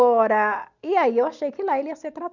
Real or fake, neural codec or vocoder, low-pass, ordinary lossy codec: real; none; 7.2 kHz; none